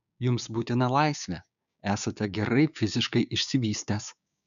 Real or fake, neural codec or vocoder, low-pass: fake; codec, 16 kHz, 6 kbps, DAC; 7.2 kHz